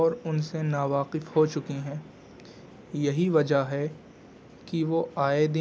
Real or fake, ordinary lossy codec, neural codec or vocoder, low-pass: real; none; none; none